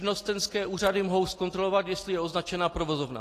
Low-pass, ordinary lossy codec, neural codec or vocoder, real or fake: 14.4 kHz; AAC, 48 kbps; none; real